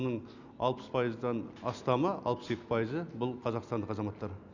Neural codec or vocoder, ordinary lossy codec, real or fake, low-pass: none; none; real; 7.2 kHz